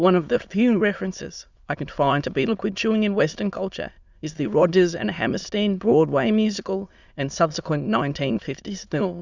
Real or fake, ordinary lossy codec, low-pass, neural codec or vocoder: fake; Opus, 64 kbps; 7.2 kHz; autoencoder, 22.05 kHz, a latent of 192 numbers a frame, VITS, trained on many speakers